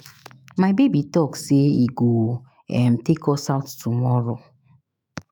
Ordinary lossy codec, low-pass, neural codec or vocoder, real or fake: none; none; autoencoder, 48 kHz, 128 numbers a frame, DAC-VAE, trained on Japanese speech; fake